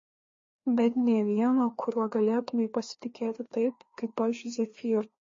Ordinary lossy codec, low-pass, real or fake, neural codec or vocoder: MP3, 32 kbps; 7.2 kHz; fake; codec, 16 kHz, 2 kbps, FreqCodec, larger model